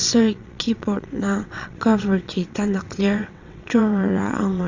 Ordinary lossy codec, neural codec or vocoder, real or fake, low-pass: AAC, 48 kbps; vocoder, 22.05 kHz, 80 mel bands, WaveNeXt; fake; 7.2 kHz